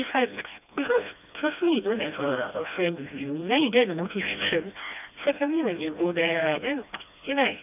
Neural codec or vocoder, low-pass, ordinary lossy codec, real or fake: codec, 16 kHz, 1 kbps, FreqCodec, smaller model; 3.6 kHz; none; fake